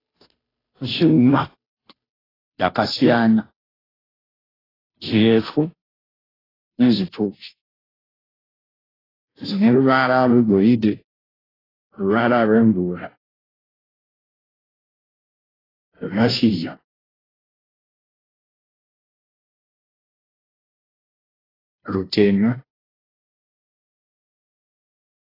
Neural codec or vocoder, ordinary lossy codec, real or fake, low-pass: codec, 16 kHz, 0.5 kbps, FunCodec, trained on Chinese and English, 25 frames a second; AAC, 24 kbps; fake; 5.4 kHz